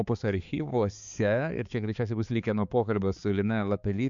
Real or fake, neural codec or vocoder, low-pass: fake; codec, 16 kHz, 4 kbps, X-Codec, HuBERT features, trained on balanced general audio; 7.2 kHz